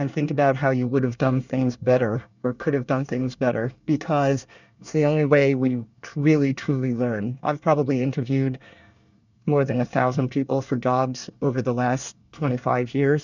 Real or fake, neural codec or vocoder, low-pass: fake; codec, 24 kHz, 1 kbps, SNAC; 7.2 kHz